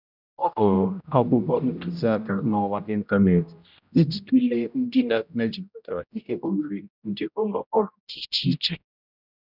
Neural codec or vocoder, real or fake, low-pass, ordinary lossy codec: codec, 16 kHz, 0.5 kbps, X-Codec, HuBERT features, trained on general audio; fake; 5.4 kHz; none